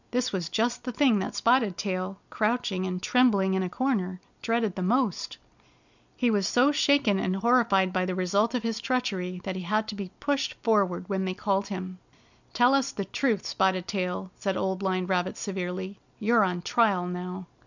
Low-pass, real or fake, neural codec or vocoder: 7.2 kHz; real; none